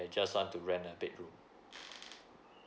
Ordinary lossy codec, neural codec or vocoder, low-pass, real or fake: none; none; none; real